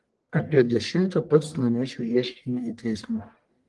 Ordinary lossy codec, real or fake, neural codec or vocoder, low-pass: Opus, 24 kbps; fake; codec, 44.1 kHz, 1.7 kbps, Pupu-Codec; 10.8 kHz